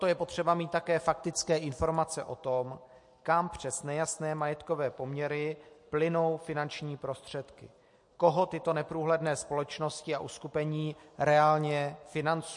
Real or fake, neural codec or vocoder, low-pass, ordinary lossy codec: real; none; 10.8 kHz; MP3, 48 kbps